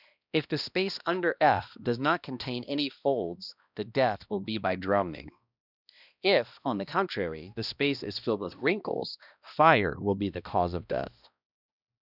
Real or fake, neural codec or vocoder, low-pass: fake; codec, 16 kHz, 1 kbps, X-Codec, HuBERT features, trained on balanced general audio; 5.4 kHz